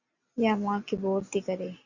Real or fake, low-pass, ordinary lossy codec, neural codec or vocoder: real; 7.2 kHz; Opus, 64 kbps; none